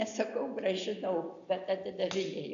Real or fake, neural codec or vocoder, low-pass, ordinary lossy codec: real; none; 7.2 kHz; AAC, 64 kbps